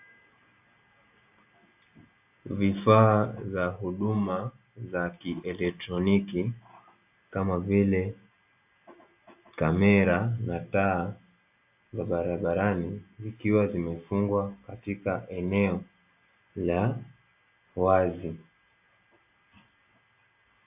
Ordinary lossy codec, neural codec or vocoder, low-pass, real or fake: AAC, 32 kbps; none; 3.6 kHz; real